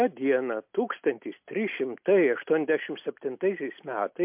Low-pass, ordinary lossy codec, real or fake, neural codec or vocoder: 3.6 kHz; MP3, 32 kbps; real; none